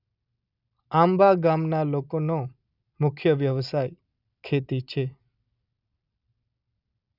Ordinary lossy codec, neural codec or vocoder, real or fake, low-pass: none; none; real; 5.4 kHz